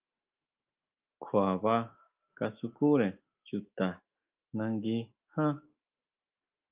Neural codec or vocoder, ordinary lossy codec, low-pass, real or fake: autoencoder, 48 kHz, 128 numbers a frame, DAC-VAE, trained on Japanese speech; Opus, 24 kbps; 3.6 kHz; fake